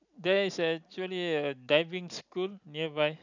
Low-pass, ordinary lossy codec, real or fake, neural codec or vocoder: 7.2 kHz; none; real; none